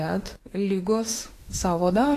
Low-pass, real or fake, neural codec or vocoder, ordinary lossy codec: 14.4 kHz; fake; autoencoder, 48 kHz, 32 numbers a frame, DAC-VAE, trained on Japanese speech; AAC, 48 kbps